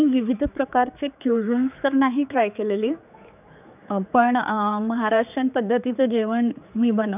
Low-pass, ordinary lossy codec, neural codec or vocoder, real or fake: 3.6 kHz; none; codec, 16 kHz, 4 kbps, X-Codec, HuBERT features, trained on general audio; fake